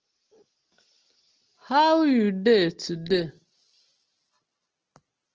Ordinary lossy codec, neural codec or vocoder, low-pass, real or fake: Opus, 16 kbps; none; 7.2 kHz; real